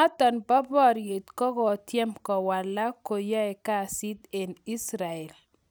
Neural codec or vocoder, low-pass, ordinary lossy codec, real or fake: none; none; none; real